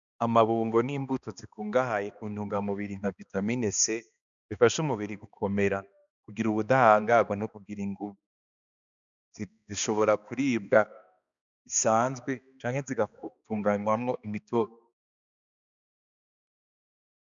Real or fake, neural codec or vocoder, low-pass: fake; codec, 16 kHz, 2 kbps, X-Codec, HuBERT features, trained on balanced general audio; 7.2 kHz